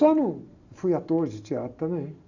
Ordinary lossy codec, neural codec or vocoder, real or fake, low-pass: none; none; real; 7.2 kHz